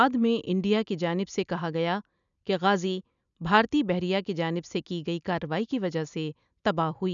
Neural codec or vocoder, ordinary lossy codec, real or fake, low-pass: none; none; real; 7.2 kHz